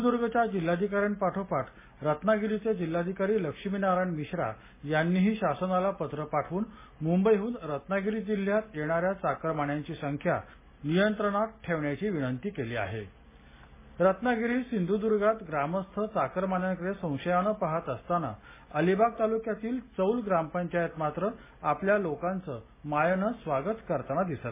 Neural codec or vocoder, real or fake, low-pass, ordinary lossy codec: none; real; 3.6 kHz; MP3, 16 kbps